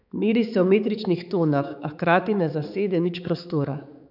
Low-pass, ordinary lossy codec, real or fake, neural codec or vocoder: 5.4 kHz; none; fake; codec, 16 kHz, 4 kbps, X-Codec, HuBERT features, trained on balanced general audio